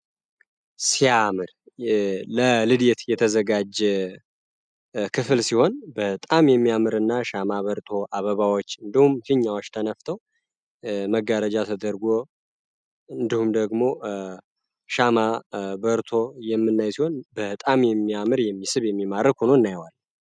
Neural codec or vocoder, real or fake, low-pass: none; real; 9.9 kHz